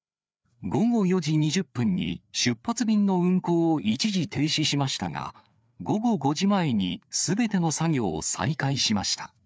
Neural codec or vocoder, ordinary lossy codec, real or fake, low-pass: codec, 16 kHz, 4 kbps, FreqCodec, larger model; none; fake; none